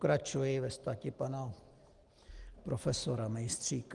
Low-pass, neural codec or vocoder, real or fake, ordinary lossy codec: 10.8 kHz; none; real; Opus, 24 kbps